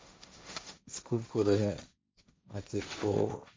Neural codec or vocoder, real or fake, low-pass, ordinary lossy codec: codec, 16 kHz, 1.1 kbps, Voila-Tokenizer; fake; none; none